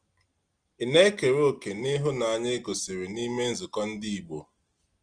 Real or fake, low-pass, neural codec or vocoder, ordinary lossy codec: real; 9.9 kHz; none; Opus, 24 kbps